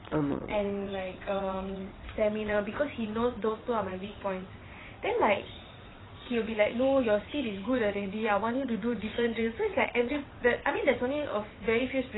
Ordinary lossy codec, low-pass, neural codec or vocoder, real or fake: AAC, 16 kbps; 7.2 kHz; vocoder, 22.05 kHz, 80 mel bands, WaveNeXt; fake